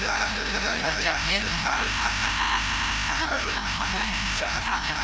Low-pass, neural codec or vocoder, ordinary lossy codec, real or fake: none; codec, 16 kHz, 0.5 kbps, FreqCodec, larger model; none; fake